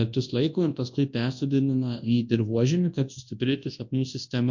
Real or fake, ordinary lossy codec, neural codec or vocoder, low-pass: fake; MP3, 48 kbps; codec, 24 kHz, 0.9 kbps, WavTokenizer, large speech release; 7.2 kHz